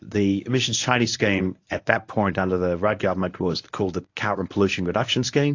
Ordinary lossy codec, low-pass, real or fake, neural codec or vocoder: AAC, 48 kbps; 7.2 kHz; fake; codec, 24 kHz, 0.9 kbps, WavTokenizer, medium speech release version 1